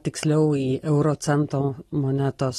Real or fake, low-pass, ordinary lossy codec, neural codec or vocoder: real; 19.8 kHz; AAC, 32 kbps; none